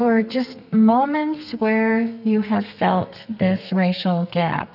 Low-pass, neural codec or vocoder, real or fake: 5.4 kHz; codec, 32 kHz, 1.9 kbps, SNAC; fake